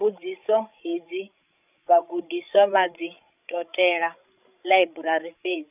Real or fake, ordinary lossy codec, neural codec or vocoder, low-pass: fake; none; codec, 16 kHz, 16 kbps, FreqCodec, larger model; 3.6 kHz